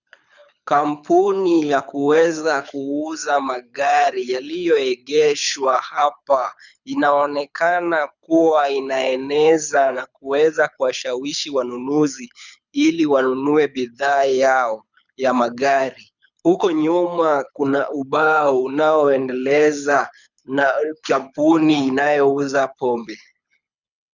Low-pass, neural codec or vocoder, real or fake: 7.2 kHz; codec, 24 kHz, 6 kbps, HILCodec; fake